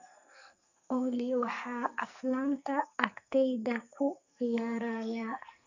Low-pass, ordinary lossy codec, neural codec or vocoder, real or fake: 7.2 kHz; none; codec, 44.1 kHz, 2.6 kbps, SNAC; fake